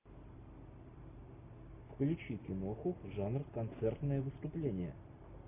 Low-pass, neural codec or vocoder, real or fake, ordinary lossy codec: 3.6 kHz; none; real; AAC, 32 kbps